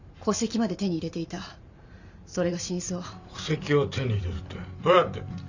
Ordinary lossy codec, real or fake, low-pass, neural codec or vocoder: none; fake; 7.2 kHz; vocoder, 44.1 kHz, 128 mel bands every 512 samples, BigVGAN v2